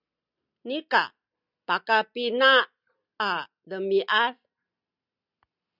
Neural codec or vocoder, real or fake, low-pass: none; real; 5.4 kHz